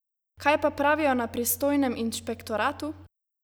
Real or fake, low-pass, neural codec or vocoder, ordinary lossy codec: real; none; none; none